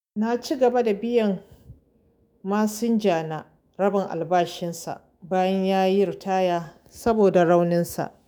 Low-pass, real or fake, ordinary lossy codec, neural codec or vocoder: none; fake; none; autoencoder, 48 kHz, 128 numbers a frame, DAC-VAE, trained on Japanese speech